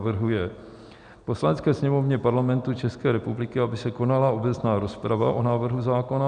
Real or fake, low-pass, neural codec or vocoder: real; 9.9 kHz; none